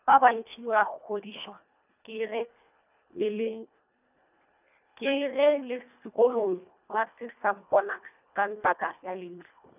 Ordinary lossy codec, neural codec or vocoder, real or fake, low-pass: none; codec, 24 kHz, 1.5 kbps, HILCodec; fake; 3.6 kHz